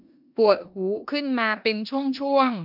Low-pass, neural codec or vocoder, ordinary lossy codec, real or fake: 5.4 kHz; codec, 16 kHz in and 24 kHz out, 0.9 kbps, LongCat-Audio-Codec, four codebook decoder; none; fake